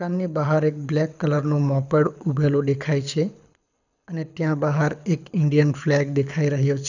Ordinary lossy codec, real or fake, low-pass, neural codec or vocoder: none; fake; 7.2 kHz; codec, 24 kHz, 6 kbps, HILCodec